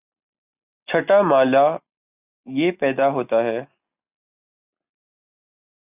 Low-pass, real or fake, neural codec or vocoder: 3.6 kHz; real; none